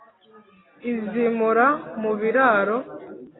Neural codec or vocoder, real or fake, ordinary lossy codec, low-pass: none; real; AAC, 16 kbps; 7.2 kHz